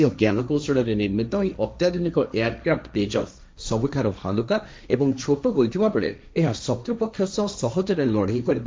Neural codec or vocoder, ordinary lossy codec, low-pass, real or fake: codec, 16 kHz, 1.1 kbps, Voila-Tokenizer; none; none; fake